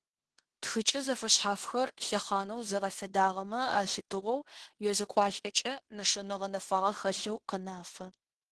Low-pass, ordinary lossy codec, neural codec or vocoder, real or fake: 10.8 kHz; Opus, 16 kbps; codec, 16 kHz in and 24 kHz out, 0.9 kbps, LongCat-Audio-Codec, fine tuned four codebook decoder; fake